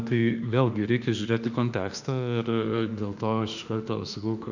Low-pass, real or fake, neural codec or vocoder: 7.2 kHz; fake; autoencoder, 48 kHz, 32 numbers a frame, DAC-VAE, trained on Japanese speech